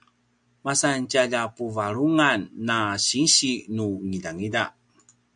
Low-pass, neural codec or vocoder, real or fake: 9.9 kHz; none; real